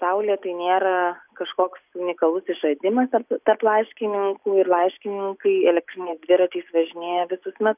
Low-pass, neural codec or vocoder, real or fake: 3.6 kHz; none; real